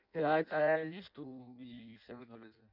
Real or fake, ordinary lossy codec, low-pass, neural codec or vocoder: fake; none; 5.4 kHz; codec, 16 kHz in and 24 kHz out, 0.6 kbps, FireRedTTS-2 codec